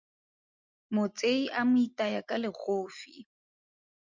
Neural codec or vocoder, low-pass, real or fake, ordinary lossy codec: none; 7.2 kHz; real; MP3, 64 kbps